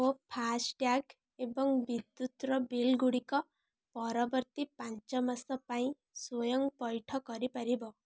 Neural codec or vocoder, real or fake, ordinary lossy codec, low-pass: none; real; none; none